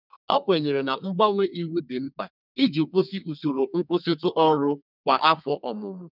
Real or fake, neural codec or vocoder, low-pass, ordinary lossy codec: fake; codec, 32 kHz, 1.9 kbps, SNAC; 5.4 kHz; none